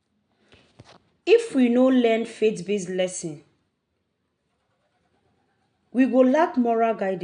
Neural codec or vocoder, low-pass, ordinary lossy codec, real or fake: none; 9.9 kHz; none; real